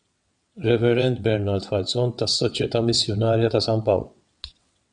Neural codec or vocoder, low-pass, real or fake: vocoder, 22.05 kHz, 80 mel bands, WaveNeXt; 9.9 kHz; fake